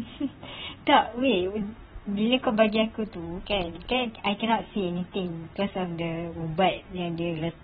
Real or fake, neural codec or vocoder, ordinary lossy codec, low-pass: fake; vocoder, 44.1 kHz, 128 mel bands, Pupu-Vocoder; AAC, 16 kbps; 19.8 kHz